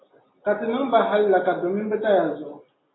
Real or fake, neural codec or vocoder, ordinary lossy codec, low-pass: real; none; AAC, 16 kbps; 7.2 kHz